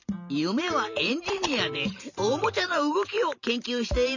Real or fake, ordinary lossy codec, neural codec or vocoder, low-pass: fake; none; vocoder, 44.1 kHz, 128 mel bands every 512 samples, BigVGAN v2; 7.2 kHz